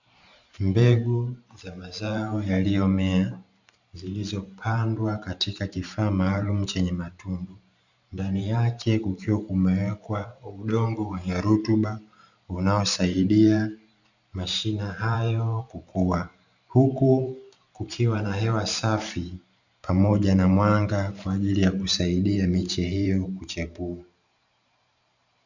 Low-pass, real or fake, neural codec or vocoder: 7.2 kHz; fake; vocoder, 44.1 kHz, 128 mel bands every 512 samples, BigVGAN v2